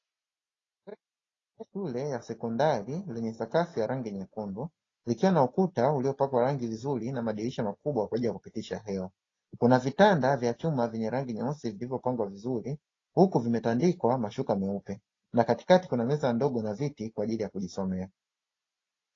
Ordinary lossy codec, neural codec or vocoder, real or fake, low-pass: AAC, 32 kbps; none; real; 7.2 kHz